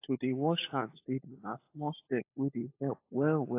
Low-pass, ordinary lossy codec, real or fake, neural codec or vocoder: 3.6 kHz; AAC, 24 kbps; fake; codec, 16 kHz, 16 kbps, FunCodec, trained on LibriTTS, 50 frames a second